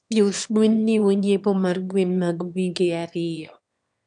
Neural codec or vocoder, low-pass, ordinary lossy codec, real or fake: autoencoder, 22.05 kHz, a latent of 192 numbers a frame, VITS, trained on one speaker; 9.9 kHz; none; fake